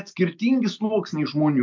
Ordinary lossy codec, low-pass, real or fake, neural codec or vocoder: MP3, 64 kbps; 7.2 kHz; real; none